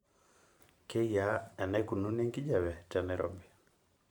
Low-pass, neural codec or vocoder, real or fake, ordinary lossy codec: 19.8 kHz; vocoder, 44.1 kHz, 128 mel bands every 512 samples, BigVGAN v2; fake; none